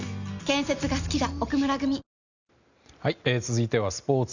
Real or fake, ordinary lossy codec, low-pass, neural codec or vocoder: real; none; 7.2 kHz; none